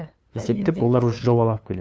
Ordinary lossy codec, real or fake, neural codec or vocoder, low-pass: none; fake; codec, 16 kHz, 2 kbps, FunCodec, trained on LibriTTS, 25 frames a second; none